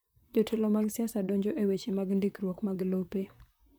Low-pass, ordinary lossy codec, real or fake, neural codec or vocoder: none; none; fake; vocoder, 44.1 kHz, 128 mel bands, Pupu-Vocoder